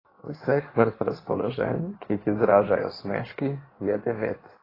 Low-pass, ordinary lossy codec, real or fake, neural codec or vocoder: 5.4 kHz; AAC, 24 kbps; fake; codec, 16 kHz in and 24 kHz out, 1.1 kbps, FireRedTTS-2 codec